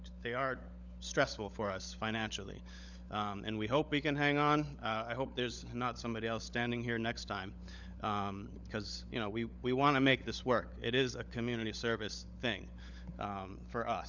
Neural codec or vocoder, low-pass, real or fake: codec, 16 kHz, 16 kbps, FunCodec, trained on LibriTTS, 50 frames a second; 7.2 kHz; fake